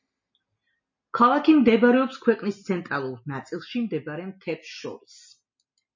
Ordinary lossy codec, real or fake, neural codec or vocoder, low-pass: MP3, 32 kbps; real; none; 7.2 kHz